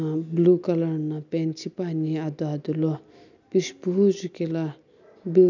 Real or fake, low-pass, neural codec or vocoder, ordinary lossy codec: real; 7.2 kHz; none; none